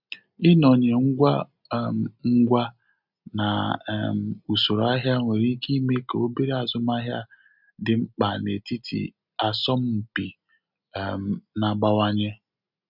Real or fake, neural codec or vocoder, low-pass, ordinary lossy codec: real; none; 5.4 kHz; none